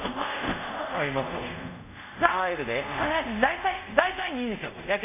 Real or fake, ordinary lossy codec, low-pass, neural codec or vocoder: fake; none; 3.6 kHz; codec, 24 kHz, 0.5 kbps, DualCodec